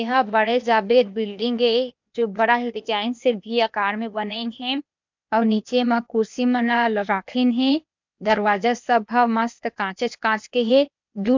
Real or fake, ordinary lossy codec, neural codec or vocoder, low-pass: fake; MP3, 64 kbps; codec, 16 kHz, 0.8 kbps, ZipCodec; 7.2 kHz